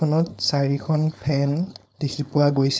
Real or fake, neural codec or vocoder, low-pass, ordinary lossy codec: fake; codec, 16 kHz, 4.8 kbps, FACodec; none; none